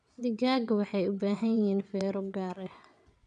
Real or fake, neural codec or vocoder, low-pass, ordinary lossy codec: fake; vocoder, 22.05 kHz, 80 mel bands, WaveNeXt; 9.9 kHz; none